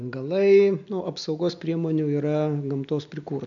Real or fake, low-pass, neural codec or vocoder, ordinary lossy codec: real; 7.2 kHz; none; AAC, 64 kbps